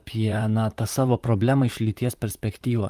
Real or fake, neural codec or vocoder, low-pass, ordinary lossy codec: fake; vocoder, 44.1 kHz, 128 mel bands, Pupu-Vocoder; 14.4 kHz; Opus, 32 kbps